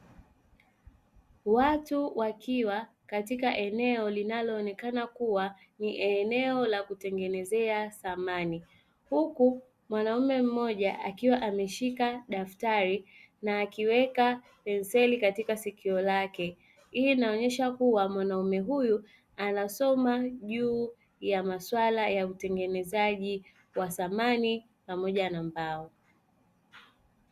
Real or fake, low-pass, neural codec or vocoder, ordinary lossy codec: real; 14.4 kHz; none; Opus, 64 kbps